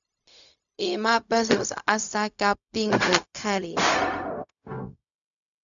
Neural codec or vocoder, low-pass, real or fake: codec, 16 kHz, 0.4 kbps, LongCat-Audio-Codec; 7.2 kHz; fake